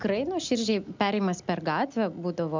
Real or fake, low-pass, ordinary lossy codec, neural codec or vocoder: real; 7.2 kHz; MP3, 64 kbps; none